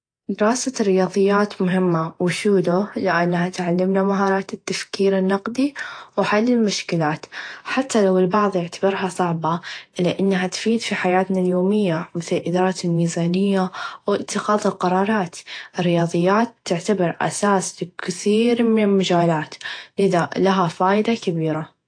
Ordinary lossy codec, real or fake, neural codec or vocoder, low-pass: AAC, 48 kbps; fake; vocoder, 48 kHz, 128 mel bands, Vocos; 9.9 kHz